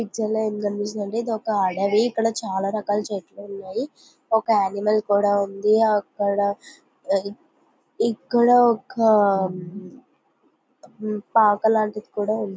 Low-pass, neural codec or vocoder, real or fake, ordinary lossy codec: none; none; real; none